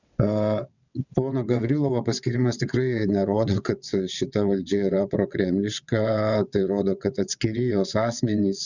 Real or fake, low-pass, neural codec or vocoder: fake; 7.2 kHz; vocoder, 22.05 kHz, 80 mel bands, WaveNeXt